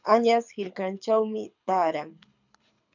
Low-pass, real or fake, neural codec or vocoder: 7.2 kHz; fake; codec, 24 kHz, 6 kbps, HILCodec